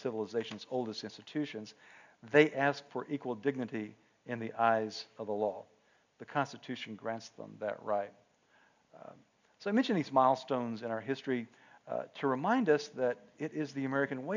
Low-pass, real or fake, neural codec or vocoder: 7.2 kHz; real; none